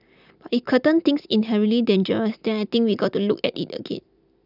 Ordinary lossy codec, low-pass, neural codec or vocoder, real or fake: none; 5.4 kHz; none; real